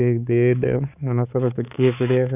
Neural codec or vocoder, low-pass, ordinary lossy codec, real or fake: codec, 16 kHz, 4 kbps, X-Codec, HuBERT features, trained on balanced general audio; 3.6 kHz; none; fake